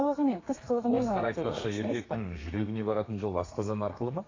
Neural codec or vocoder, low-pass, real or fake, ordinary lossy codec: codec, 32 kHz, 1.9 kbps, SNAC; 7.2 kHz; fake; AAC, 32 kbps